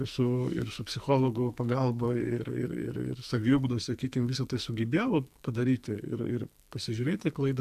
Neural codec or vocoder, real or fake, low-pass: codec, 44.1 kHz, 2.6 kbps, SNAC; fake; 14.4 kHz